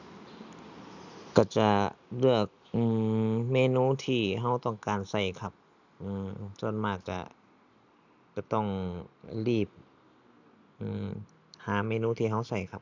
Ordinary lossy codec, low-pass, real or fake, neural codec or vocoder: none; 7.2 kHz; real; none